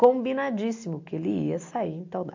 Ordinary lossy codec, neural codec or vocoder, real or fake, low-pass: MP3, 48 kbps; none; real; 7.2 kHz